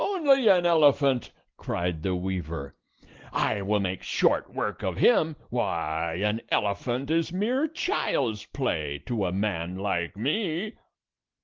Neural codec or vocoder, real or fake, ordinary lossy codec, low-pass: none; real; Opus, 16 kbps; 7.2 kHz